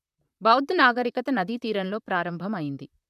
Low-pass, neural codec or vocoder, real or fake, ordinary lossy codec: 14.4 kHz; vocoder, 44.1 kHz, 128 mel bands, Pupu-Vocoder; fake; none